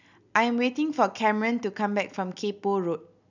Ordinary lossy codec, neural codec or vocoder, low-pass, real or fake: none; none; 7.2 kHz; real